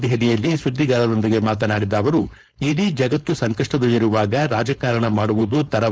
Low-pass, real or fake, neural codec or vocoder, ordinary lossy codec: none; fake; codec, 16 kHz, 4.8 kbps, FACodec; none